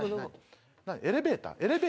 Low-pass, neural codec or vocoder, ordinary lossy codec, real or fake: none; none; none; real